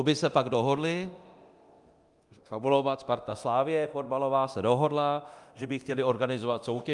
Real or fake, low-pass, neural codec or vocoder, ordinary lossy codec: fake; 10.8 kHz; codec, 24 kHz, 0.9 kbps, DualCodec; Opus, 32 kbps